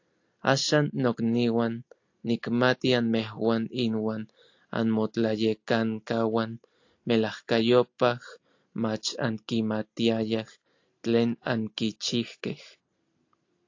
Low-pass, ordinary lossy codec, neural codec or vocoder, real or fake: 7.2 kHz; AAC, 48 kbps; none; real